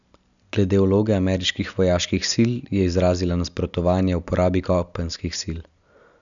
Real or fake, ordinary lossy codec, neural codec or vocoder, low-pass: real; none; none; 7.2 kHz